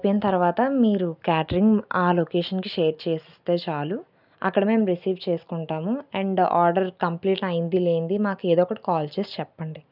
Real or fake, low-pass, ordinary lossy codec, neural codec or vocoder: real; 5.4 kHz; none; none